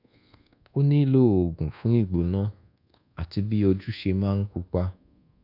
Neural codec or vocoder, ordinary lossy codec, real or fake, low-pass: codec, 24 kHz, 1.2 kbps, DualCodec; none; fake; 5.4 kHz